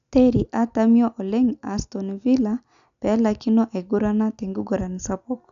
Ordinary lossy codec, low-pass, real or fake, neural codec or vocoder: none; 7.2 kHz; real; none